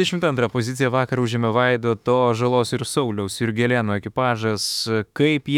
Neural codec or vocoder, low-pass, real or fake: autoencoder, 48 kHz, 32 numbers a frame, DAC-VAE, trained on Japanese speech; 19.8 kHz; fake